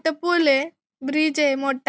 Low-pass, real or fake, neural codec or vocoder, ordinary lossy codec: none; real; none; none